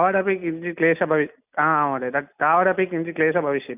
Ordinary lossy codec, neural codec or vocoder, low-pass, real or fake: none; none; 3.6 kHz; real